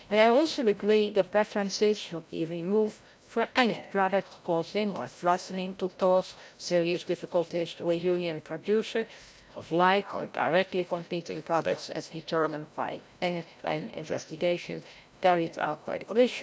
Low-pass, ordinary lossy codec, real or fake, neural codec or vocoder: none; none; fake; codec, 16 kHz, 0.5 kbps, FreqCodec, larger model